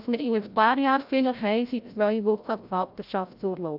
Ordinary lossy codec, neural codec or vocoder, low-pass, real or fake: AAC, 48 kbps; codec, 16 kHz, 0.5 kbps, FreqCodec, larger model; 5.4 kHz; fake